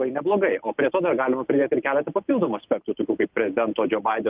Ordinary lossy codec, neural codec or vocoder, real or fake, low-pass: Opus, 24 kbps; none; real; 3.6 kHz